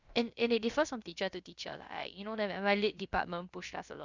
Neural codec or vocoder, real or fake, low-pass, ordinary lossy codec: codec, 16 kHz, about 1 kbps, DyCAST, with the encoder's durations; fake; 7.2 kHz; Opus, 64 kbps